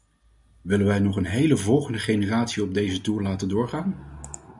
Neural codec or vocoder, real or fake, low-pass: none; real; 10.8 kHz